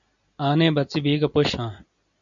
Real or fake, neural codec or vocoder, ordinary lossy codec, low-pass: real; none; AAC, 64 kbps; 7.2 kHz